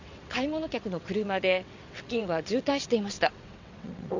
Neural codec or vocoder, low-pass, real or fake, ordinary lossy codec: vocoder, 22.05 kHz, 80 mel bands, WaveNeXt; 7.2 kHz; fake; Opus, 64 kbps